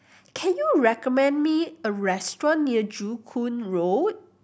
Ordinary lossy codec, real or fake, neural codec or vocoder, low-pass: none; real; none; none